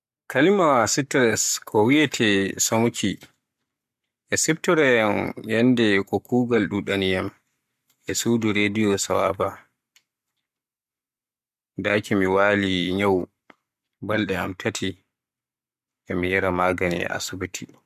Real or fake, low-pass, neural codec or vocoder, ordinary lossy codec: fake; 14.4 kHz; codec, 44.1 kHz, 7.8 kbps, Pupu-Codec; MP3, 96 kbps